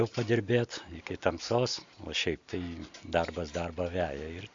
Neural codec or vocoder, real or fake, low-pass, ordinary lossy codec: none; real; 7.2 kHz; AAC, 64 kbps